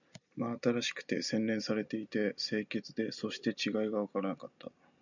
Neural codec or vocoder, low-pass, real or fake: none; 7.2 kHz; real